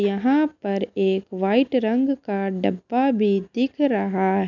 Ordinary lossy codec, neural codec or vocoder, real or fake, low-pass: none; none; real; 7.2 kHz